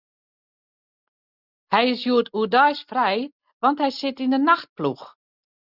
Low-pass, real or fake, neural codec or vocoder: 5.4 kHz; real; none